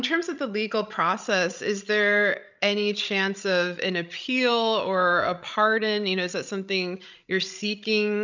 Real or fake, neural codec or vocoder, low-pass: fake; codec, 16 kHz, 16 kbps, FreqCodec, larger model; 7.2 kHz